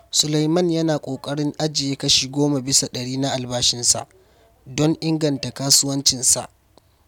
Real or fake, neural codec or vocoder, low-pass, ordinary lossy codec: real; none; 19.8 kHz; none